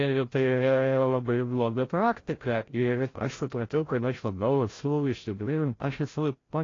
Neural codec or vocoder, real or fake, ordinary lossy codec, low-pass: codec, 16 kHz, 0.5 kbps, FreqCodec, larger model; fake; AAC, 32 kbps; 7.2 kHz